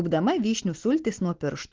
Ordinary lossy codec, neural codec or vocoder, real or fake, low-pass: Opus, 16 kbps; none; real; 7.2 kHz